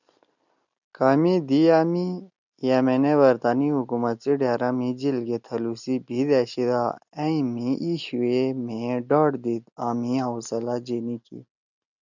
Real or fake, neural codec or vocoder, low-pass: real; none; 7.2 kHz